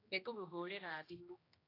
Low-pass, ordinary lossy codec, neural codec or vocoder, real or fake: 5.4 kHz; AAC, 24 kbps; codec, 16 kHz, 1 kbps, X-Codec, HuBERT features, trained on general audio; fake